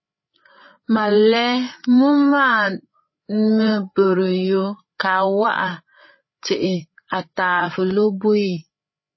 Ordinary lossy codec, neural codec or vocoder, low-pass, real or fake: MP3, 24 kbps; codec, 16 kHz, 8 kbps, FreqCodec, larger model; 7.2 kHz; fake